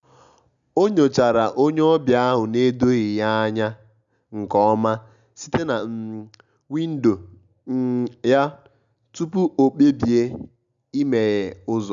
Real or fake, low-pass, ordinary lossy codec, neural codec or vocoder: real; 7.2 kHz; none; none